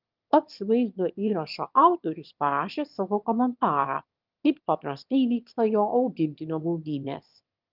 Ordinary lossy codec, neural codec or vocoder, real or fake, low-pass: Opus, 24 kbps; autoencoder, 22.05 kHz, a latent of 192 numbers a frame, VITS, trained on one speaker; fake; 5.4 kHz